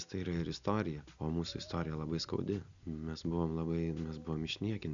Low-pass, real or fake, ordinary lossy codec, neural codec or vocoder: 7.2 kHz; real; MP3, 96 kbps; none